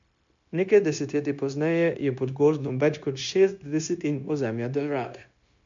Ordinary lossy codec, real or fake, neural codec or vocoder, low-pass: MP3, 64 kbps; fake; codec, 16 kHz, 0.9 kbps, LongCat-Audio-Codec; 7.2 kHz